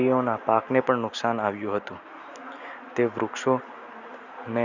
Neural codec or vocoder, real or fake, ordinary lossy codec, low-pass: none; real; none; 7.2 kHz